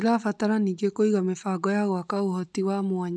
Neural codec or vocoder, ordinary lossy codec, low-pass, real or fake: none; none; none; real